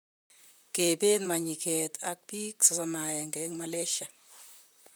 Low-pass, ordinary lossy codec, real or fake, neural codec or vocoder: none; none; fake; vocoder, 44.1 kHz, 128 mel bands, Pupu-Vocoder